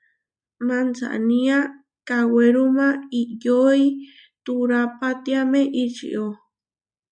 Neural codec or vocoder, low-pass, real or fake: none; 9.9 kHz; real